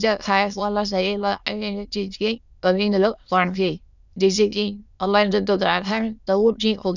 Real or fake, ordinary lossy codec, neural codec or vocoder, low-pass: fake; none; autoencoder, 22.05 kHz, a latent of 192 numbers a frame, VITS, trained on many speakers; 7.2 kHz